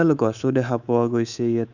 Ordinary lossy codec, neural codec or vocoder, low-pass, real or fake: none; codec, 24 kHz, 3.1 kbps, DualCodec; 7.2 kHz; fake